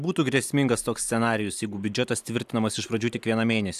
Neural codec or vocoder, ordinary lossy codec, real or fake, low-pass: none; AAC, 96 kbps; real; 14.4 kHz